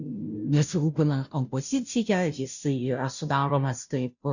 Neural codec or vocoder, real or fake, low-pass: codec, 16 kHz, 0.5 kbps, FunCodec, trained on Chinese and English, 25 frames a second; fake; 7.2 kHz